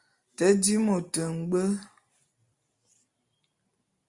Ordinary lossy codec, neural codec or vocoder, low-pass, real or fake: Opus, 64 kbps; none; 10.8 kHz; real